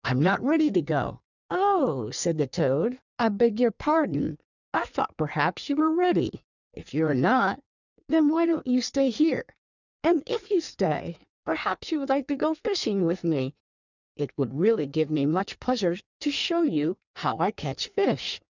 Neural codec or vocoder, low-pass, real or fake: codec, 16 kHz in and 24 kHz out, 1.1 kbps, FireRedTTS-2 codec; 7.2 kHz; fake